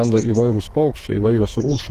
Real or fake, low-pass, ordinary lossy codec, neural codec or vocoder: fake; 14.4 kHz; Opus, 16 kbps; codec, 32 kHz, 1.9 kbps, SNAC